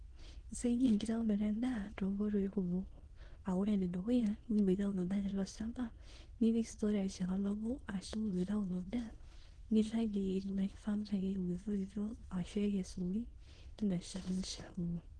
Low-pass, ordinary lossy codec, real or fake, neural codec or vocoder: 9.9 kHz; Opus, 16 kbps; fake; autoencoder, 22.05 kHz, a latent of 192 numbers a frame, VITS, trained on many speakers